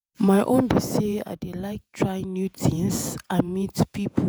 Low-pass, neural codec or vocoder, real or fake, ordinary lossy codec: none; vocoder, 48 kHz, 128 mel bands, Vocos; fake; none